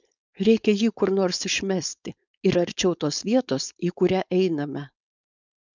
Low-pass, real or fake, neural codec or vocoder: 7.2 kHz; fake; codec, 16 kHz, 4.8 kbps, FACodec